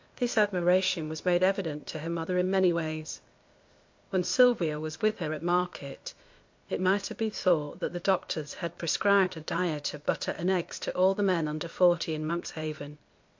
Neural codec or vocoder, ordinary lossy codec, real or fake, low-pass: codec, 16 kHz, 0.8 kbps, ZipCodec; MP3, 48 kbps; fake; 7.2 kHz